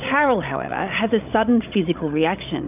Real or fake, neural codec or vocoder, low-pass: fake; codec, 16 kHz, 16 kbps, FreqCodec, larger model; 3.6 kHz